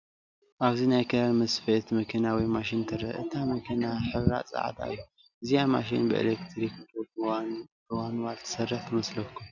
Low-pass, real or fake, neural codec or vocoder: 7.2 kHz; real; none